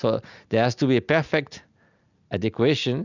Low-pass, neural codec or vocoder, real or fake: 7.2 kHz; none; real